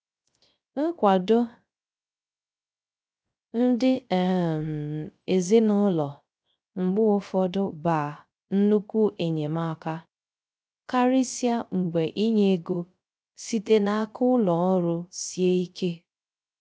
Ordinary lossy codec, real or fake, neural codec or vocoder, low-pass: none; fake; codec, 16 kHz, 0.3 kbps, FocalCodec; none